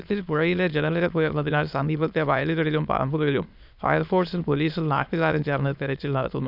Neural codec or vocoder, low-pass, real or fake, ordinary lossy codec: autoencoder, 22.05 kHz, a latent of 192 numbers a frame, VITS, trained on many speakers; 5.4 kHz; fake; none